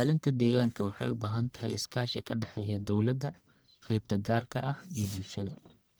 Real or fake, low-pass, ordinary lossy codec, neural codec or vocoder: fake; none; none; codec, 44.1 kHz, 1.7 kbps, Pupu-Codec